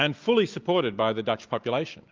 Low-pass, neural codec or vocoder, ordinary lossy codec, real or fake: 7.2 kHz; none; Opus, 24 kbps; real